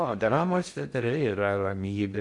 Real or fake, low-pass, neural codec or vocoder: fake; 10.8 kHz; codec, 16 kHz in and 24 kHz out, 0.6 kbps, FocalCodec, streaming, 4096 codes